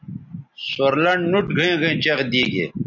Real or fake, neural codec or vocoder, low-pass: real; none; 7.2 kHz